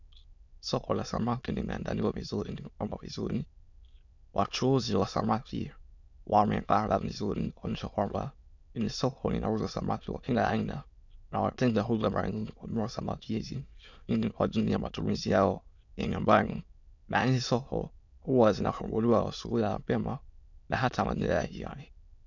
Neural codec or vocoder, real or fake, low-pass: autoencoder, 22.05 kHz, a latent of 192 numbers a frame, VITS, trained on many speakers; fake; 7.2 kHz